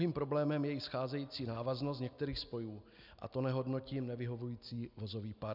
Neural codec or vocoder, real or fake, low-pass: none; real; 5.4 kHz